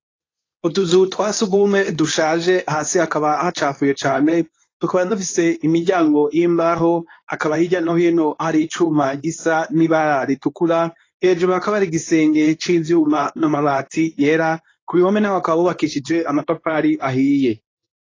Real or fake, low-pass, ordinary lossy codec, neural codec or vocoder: fake; 7.2 kHz; AAC, 32 kbps; codec, 24 kHz, 0.9 kbps, WavTokenizer, medium speech release version 2